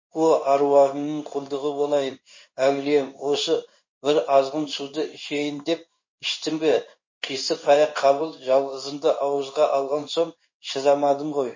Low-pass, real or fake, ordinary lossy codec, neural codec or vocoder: 7.2 kHz; fake; MP3, 32 kbps; codec, 16 kHz in and 24 kHz out, 1 kbps, XY-Tokenizer